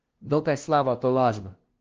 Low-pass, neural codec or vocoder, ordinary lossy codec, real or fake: 7.2 kHz; codec, 16 kHz, 0.5 kbps, FunCodec, trained on LibriTTS, 25 frames a second; Opus, 16 kbps; fake